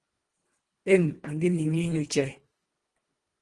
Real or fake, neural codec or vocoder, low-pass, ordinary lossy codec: fake; codec, 24 kHz, 1.5 kbps, HILCodec; 10.8 kHz; Opus, 24 kbps